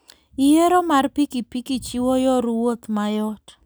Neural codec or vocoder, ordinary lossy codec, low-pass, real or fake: none; none; none; real